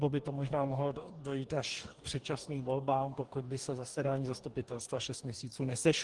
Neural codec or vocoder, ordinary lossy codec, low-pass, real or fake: codec, 44.1 kHz, 2.6 kbps, DAC; Opus, 24 kbps; 10.8 kHz; fake